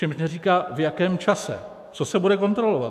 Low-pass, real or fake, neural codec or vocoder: 14.4 kHz; fake; autoencoder, 48 kHz, 128 numbers a frame, DAC-VAE, trained on Japanese speech